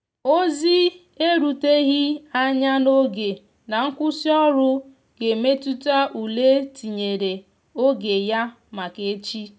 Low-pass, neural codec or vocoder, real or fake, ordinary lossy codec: none; none; real; none